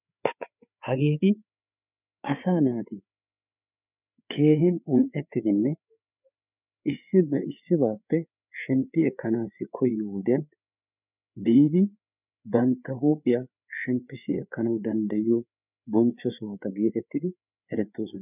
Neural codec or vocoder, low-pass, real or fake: codec, 16 kHz, 4 kbps, FreqCodec, larger model; 3.6 kHz; fake